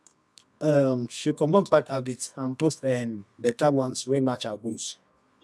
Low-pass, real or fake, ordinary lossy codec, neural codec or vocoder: none; fake; none; codec, 24 kHz, 0.9 kbps, WavTokenizer, medium music audio release